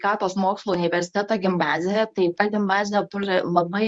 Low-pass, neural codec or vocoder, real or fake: 10.8 kHz; codec, 24 kHz, 0.9 kbps, WavTokenizer, medium speech release version 1; fake